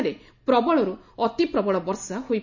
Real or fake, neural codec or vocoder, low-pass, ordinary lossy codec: real; none; 7.2 kHz; none